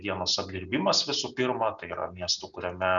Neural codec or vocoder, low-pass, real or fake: none; 7.2 kHz; real